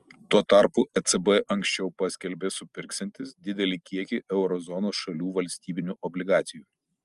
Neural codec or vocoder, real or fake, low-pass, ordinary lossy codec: none; real; 10.8 kHz; Opus, 32 kbps